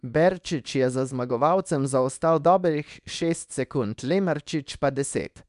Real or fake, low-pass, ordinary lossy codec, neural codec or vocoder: fake; 10.8 kHz; none; codec, 24 kHz, 0.9 kbps, WavTokenizer, small release